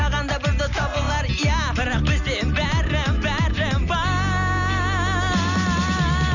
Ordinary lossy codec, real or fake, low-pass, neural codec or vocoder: none; real; 7.2 kHz; none